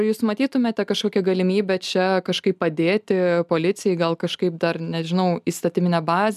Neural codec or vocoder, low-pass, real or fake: none; 14.4 kHz; real